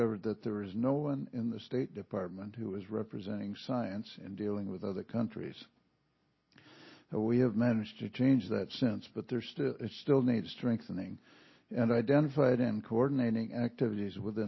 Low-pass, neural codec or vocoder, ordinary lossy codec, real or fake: 7.2 kHz; none; MP3, 24 kbps; real